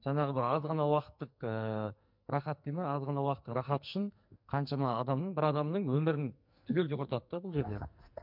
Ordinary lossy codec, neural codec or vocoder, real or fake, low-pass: MP3, 48 kbps; codec, 44.1 kHz, 2.6 kbps, SNAC; fake; 5.4 kHz